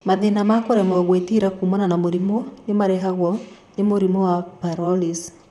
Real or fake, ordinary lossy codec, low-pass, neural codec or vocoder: fake; none; 14.4 kHz; vocoder, 44.1 kHz, 128 mel bands, Pupu-Vocoder